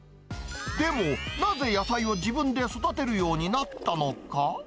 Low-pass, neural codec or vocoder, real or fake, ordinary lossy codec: none; none; real; none